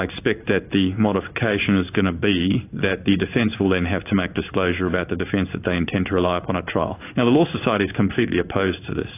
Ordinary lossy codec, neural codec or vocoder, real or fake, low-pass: AAC, 24 kbps; codec, 16 kHz in and 24 kHz out, 1 kbps, XY-Tokenizer; fake; 3.6 kHz